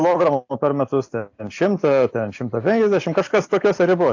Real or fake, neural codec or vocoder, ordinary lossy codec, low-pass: real; none; AAC, 48 kbps; 7.2 kHz